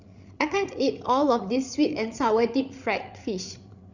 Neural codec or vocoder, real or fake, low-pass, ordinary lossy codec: codec, 16 kHz, 8 kbps, FreqCodec, larger model; fake; 7.2 kHz; none